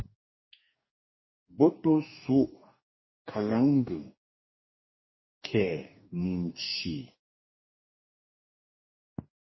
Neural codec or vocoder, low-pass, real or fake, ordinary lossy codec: codec, 44.1 kHz, 2.6 kbps, DAC; 7.2 kHz; fake; MP3, 24 kbps